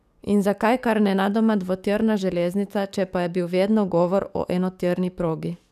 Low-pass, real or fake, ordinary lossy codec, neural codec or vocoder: 14.4 kHz; fake; none; codec, 44.1 kHz, 7.8 kbps, DAC